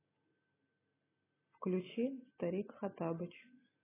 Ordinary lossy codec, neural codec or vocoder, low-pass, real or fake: AAC, 16 kbps; none; 3.6 kHz; real